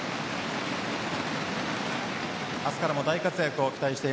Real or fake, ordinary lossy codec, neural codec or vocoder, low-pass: real; none; none; none